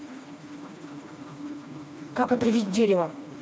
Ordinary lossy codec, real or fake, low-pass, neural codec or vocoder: none; fake; none; codec, 16 kHz, 2 kbps, FreqCodec, smaller model